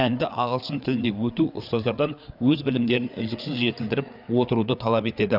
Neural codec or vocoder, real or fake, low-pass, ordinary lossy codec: codec, 16 kHz, 4 kbps, FreqCodec, larger model; fake; 5.4 kHz; none